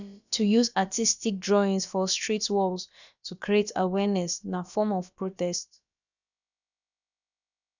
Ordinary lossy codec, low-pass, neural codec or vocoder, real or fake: none; 7.2 kHz; codec, 16 kHz, about 1 kbps, DyCAST, with the encoder's durations; fake